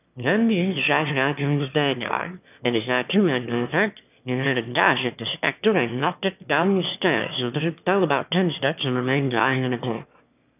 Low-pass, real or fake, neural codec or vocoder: 3.6 kHz; fake; autoencoder, 22.05 kHz, a latent of 192 numbers a frame, VITS, trained on one speaker